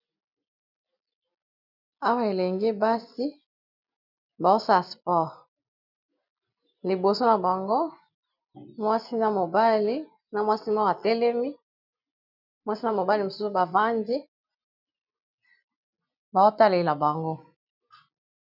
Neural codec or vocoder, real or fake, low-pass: none; real; 5.4 kHz